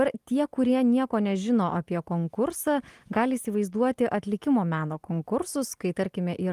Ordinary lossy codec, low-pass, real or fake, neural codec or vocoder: Opus, 24 kbps; 14.4 kHz; real; none